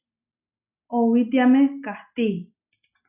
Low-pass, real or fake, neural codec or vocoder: 3.6 kHz; real; none